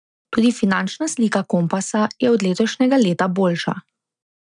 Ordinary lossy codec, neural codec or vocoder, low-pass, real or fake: none; none; 9.9 kHz; real